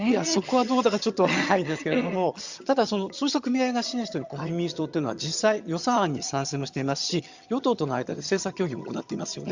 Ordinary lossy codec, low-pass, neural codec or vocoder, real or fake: Opus, 64 kbps; 7.2 kHz; vocoder, 22.05 kHz, 80 mel bands, HiFi-GAN; fake